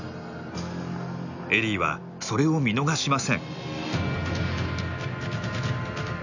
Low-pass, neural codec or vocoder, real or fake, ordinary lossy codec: 7.2 kHz; none; real; none